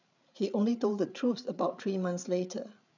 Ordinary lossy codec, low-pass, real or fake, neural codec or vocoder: none; 7.2 kHz; fake; codec, 16 kHz, 8 kbps, FreqCodec, larger model